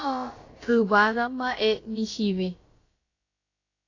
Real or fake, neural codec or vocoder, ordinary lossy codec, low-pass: fake; codec, 16 kHz, about 1 kbps, DyCAST, with the encoder's durations; AAC, 32 kbps; 7.2 kHz